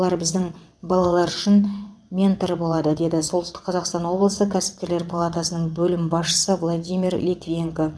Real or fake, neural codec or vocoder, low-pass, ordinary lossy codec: fake; vocoder, 22.05 kHz, 80 mel bands, WaveNeXt; none; none